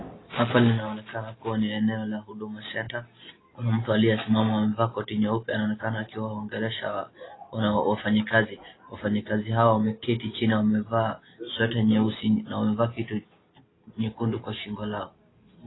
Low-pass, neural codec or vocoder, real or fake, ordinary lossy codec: 7.2 kHz; none; real; AAC, 16 kbps